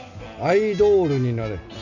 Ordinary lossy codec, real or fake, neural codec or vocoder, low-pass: none; real; none; 7.2 kHz